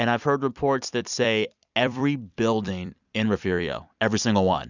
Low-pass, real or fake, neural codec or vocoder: 7.2 kHz; fake; vocoder, 44.1 kHz, 128 mel bands every 256 samples, BigVGAN v2